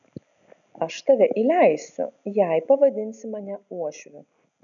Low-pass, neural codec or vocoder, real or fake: 7.2 kHz; none; real